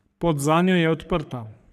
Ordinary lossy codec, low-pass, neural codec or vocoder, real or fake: none; 14.4 kHz; codec, 44.1 kHz, 3.4 kbps, Pupu-Codec; fake